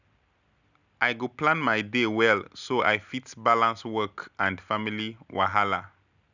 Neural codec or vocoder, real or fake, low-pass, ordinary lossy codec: none; real; 7.2 kHz; none